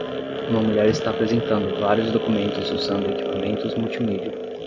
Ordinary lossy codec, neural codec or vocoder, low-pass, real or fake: AAC, 48 kbps; none; 7.2 kHz; real